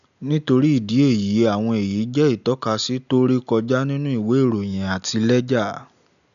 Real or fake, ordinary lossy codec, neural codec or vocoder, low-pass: real; none; none; 7.2 kHz